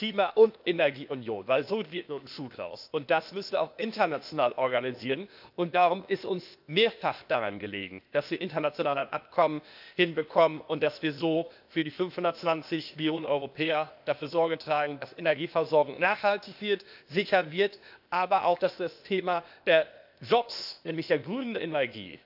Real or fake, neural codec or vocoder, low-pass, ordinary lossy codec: fake; codec, 16 kHz, 0.8 kbps, ZipCodec; 5.4 kHz; none